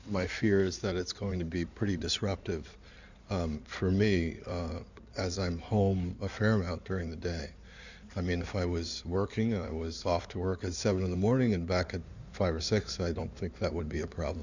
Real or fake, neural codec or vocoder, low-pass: fake; codec, 16 kHz in and 24 kHz out, 2.2 kbps, FireRedTTS-2 codec; 7.2 kHz